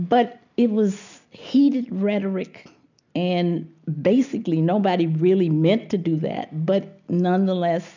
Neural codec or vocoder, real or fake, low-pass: none; real; 7.2 kHz